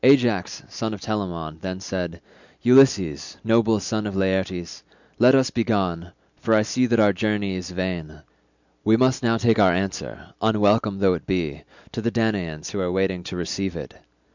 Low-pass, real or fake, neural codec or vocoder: 7.2 kHz; real; none